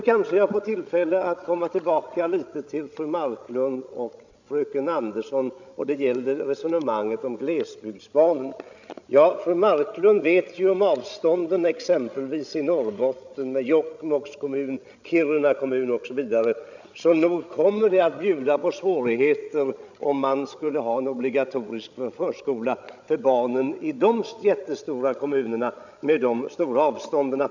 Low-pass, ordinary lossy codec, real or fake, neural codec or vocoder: 7.2 kHz; none; fake; codec, 16 kHz, 16 kbps, FreqCodec, larger model